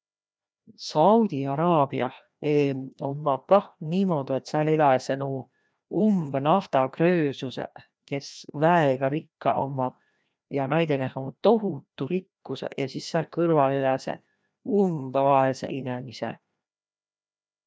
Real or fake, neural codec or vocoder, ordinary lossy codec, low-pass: fake; codec, 16 kHz, 1 kbps, FreqCodec, larger model; none; none